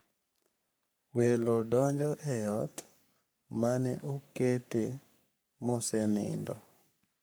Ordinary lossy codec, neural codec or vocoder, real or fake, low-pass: none; codec, 44.1 kHz, 3.4 kbps, Pupu-Codec; fake; none